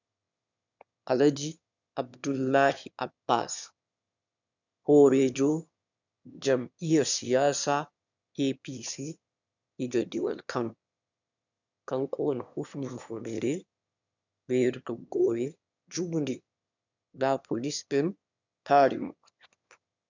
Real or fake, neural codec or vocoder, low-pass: fake; autoencoder, 22.05 kHz, a latent of 192 numbers a frame, VITS, trained on one speaker; 7.2 kHz